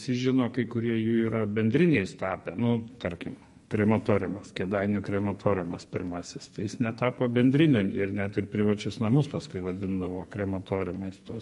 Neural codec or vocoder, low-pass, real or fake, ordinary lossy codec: codec, 44.1 kHz, 2.6 kbps, SNAC; 14.4 kHz; fake; MP3, 48 kbps